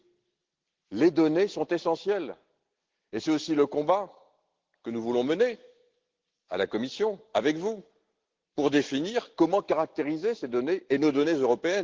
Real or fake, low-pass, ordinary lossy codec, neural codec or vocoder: real; 7.2 kHz; Opus, 16 kbps; none